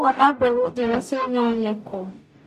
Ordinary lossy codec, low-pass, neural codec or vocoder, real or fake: none; 14.4 kHz; codec, 44.1 kHz, 0.9 kbps, DAC; fake